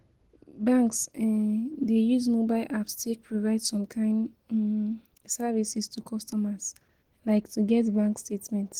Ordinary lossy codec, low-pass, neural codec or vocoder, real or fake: Opus, 16 kbps; 19.8 kHz; none; real